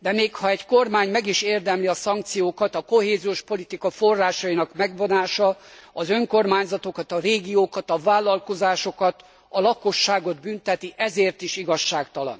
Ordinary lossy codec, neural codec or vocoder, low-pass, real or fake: none; none; none; real